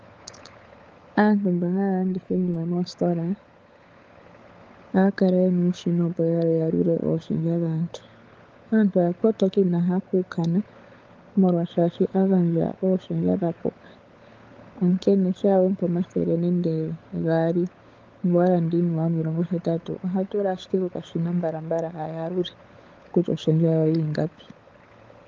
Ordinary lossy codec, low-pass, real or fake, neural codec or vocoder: Opus, 32 kbps; 7.2 kHz; fake; codec, 16 kHz, 16 kbps, FunCodec, trained on LibriTTS, 50 frames a second